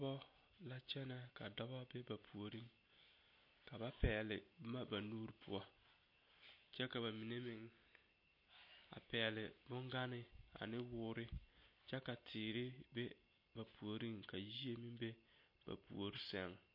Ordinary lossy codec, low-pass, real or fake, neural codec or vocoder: MP3, 32 kbps; 5.4 kHz; real; none